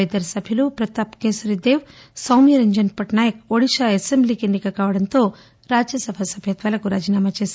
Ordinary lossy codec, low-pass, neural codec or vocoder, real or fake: none; none; none; real